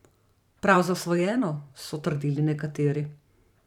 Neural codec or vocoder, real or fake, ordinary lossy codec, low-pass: vocoder, 44.1 kHz, 128 mel bands every 512 samples, BigVGAN v2; fake; none; 19.8 kHz